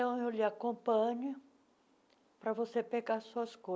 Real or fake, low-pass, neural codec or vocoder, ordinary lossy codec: real; none; none; none